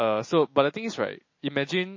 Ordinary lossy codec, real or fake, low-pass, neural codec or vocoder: MP3, 32 kbps; real; 7.2 kHz; none